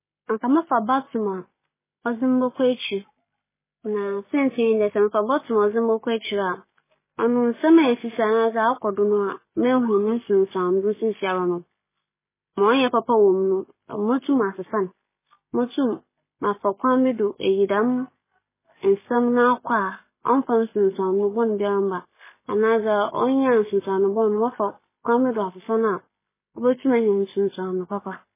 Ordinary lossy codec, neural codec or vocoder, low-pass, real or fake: MP3, 16 kbps; none; 3.6 kHz; real